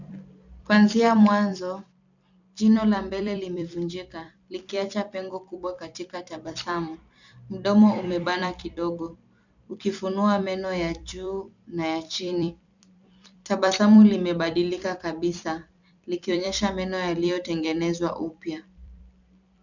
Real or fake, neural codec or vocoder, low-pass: fake; vocoder, 44.1 kHz, 128 mel bands every 256 samples, BigVGAN v2; 7.2 kHz